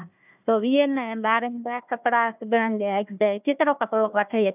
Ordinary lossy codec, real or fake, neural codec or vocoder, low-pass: none; fake; codec, 16 kHz, 1 kbps, FunCodec, trained on Chinese and English, 50 frames a second; 3.6 kHz